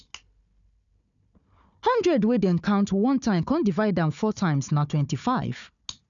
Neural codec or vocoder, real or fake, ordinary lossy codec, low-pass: codec, 16 kHz, 4 kbps, FunCodec, trained on Chinese and English, 50 frames a second; fake; MP3, 96 kbps; 7.2 kHz